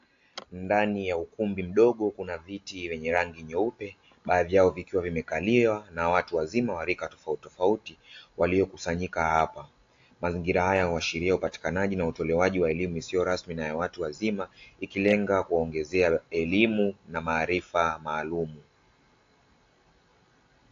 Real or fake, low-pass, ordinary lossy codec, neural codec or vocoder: real; 7.2 kHz; AAC, 48 kbps; none